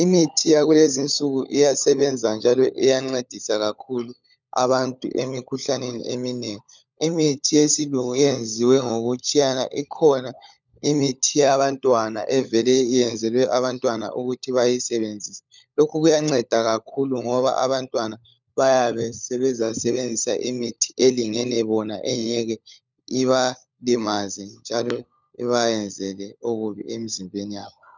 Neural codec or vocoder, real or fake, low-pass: codec, 16 kHz, 16 kbps, FunCodec, trained on LibriTTS, 50 frames a second; fake; 7.2 kHz